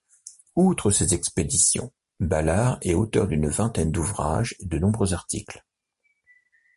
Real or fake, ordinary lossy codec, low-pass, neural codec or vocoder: real; MP3, 48 kbps; 14.4 kHz; none